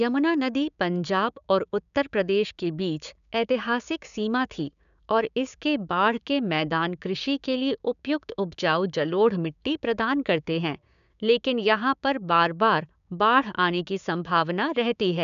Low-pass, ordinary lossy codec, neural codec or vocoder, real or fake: 7.2 kHz; none; codec, 16 kHz, 6 kbps, DAC; fake